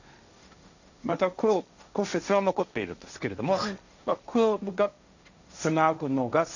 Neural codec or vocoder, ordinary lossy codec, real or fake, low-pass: codec, 16 kHz, 1.1 kbps, Voila-Tokenizer; none; fake; 7.2 kHz